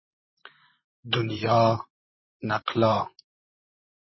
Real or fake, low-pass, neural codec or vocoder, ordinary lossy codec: fake; 7.2 kHz; vocoder, 44.1 kHz, 128 mel bands, Pupu-Vocoder; MP3, 24 kbps